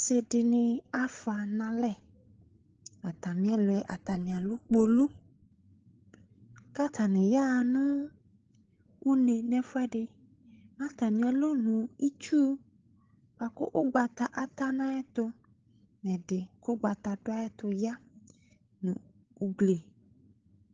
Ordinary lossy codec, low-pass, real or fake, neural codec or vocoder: Opus, 16 kbps; 7.2 kHz; fake; codec, 16 kHz, 8 kbps, FreqCodec, larger model